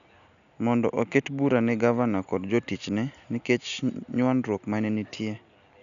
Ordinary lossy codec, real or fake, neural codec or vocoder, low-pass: none; real; none; 7.2 kHz